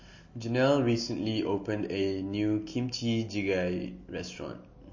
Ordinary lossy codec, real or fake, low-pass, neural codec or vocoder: MP3, 32 kbps; real; 7.2 kHz; none